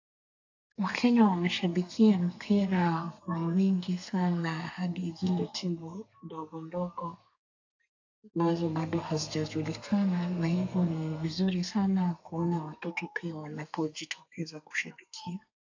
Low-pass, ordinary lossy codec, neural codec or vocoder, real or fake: 7.2 kHz; AAC, 48 kbps; codec, 32 kHz, 1.9 kbps, SNAC; fake